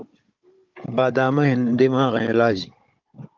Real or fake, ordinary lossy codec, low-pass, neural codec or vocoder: fake; Opus, 32 kbps; 7.2 kHz; codec, 16 kHz, 16 kbps, FunCodec, trained on Chinese and English, 50 frames a second